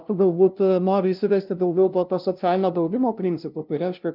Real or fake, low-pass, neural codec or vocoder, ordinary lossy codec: fake; 5.4 kHz; codec, 16 kHz, 0.5 kbps, FunCodec, trained on LibriTTS, 25 frames a second; Opus, 24 kbps